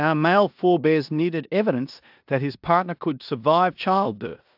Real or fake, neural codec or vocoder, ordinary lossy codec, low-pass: fake; codec, 16 kHz in and 24 kHz out, 0.9 kbps, LongCat-Audio-Codec, four codebook decoder; AAC, 48 kbps; 5.4 kHz